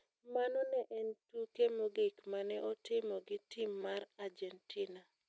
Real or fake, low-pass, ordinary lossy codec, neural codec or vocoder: real; none; none; none